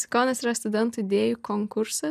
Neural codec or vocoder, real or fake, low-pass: none; real; 14.4 kHz